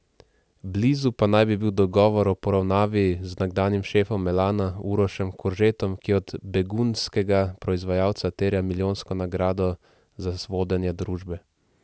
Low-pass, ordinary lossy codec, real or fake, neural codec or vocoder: none; none; real; none